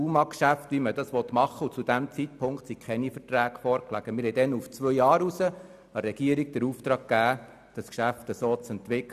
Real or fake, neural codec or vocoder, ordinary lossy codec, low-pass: real; none; none; 14.4 kHz